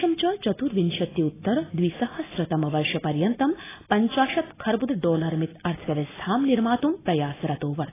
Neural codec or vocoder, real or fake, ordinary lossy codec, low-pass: none; real; AAC, 16 kbps; 3.6 kHz